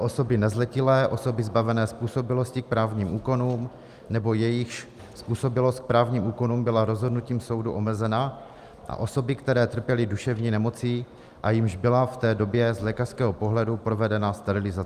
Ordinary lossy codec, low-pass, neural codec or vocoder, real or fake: Opus, 32 kbps; 14.4 kHz; none; real